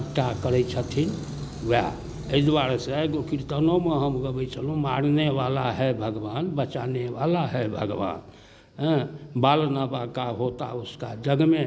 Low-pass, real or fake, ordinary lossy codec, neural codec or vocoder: none; real; none; none